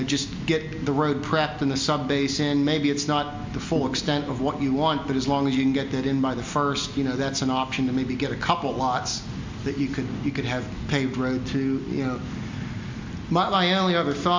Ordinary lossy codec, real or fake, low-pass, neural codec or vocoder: MP3, 48 kbps; real; 7.2 kHz; none